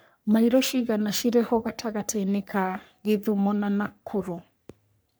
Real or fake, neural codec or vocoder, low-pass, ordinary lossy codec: fake; codec, 44.1 kHz, 3.4 kbps, Pupu-Codec; none; none